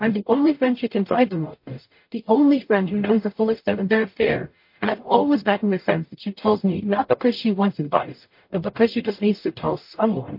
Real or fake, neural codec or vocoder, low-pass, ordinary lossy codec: fake; codec, 44.1 kHz, 0.9 kbps, DAC; 5.4 kHz; MP3, 32 kbps